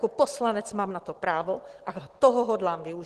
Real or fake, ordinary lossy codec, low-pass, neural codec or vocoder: real; Opus, 16 kbps; 10.8 kHz; none